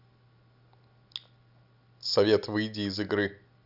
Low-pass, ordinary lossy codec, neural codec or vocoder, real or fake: 5.4 kHz; none; none; real